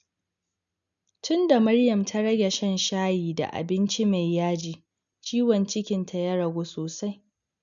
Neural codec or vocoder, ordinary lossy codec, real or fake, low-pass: none; none; real; 7.2 kHz